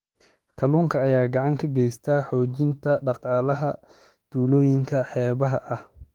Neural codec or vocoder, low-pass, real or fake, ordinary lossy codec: autoencoder, 48 kHz, 32 numbers a frame, DAC-VAE, trained on Japanese speech; 19.8 kHz; fake; Opus, 24 kbps